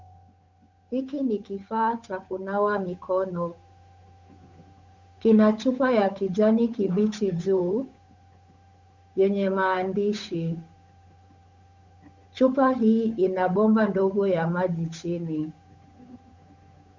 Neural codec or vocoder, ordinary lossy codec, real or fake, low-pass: codec, 16 kHz, 8 kbps, FunCodec, trained on Chinese and English, 25 frames a second; MP3, 64 kbps; fake; 7.2 kHz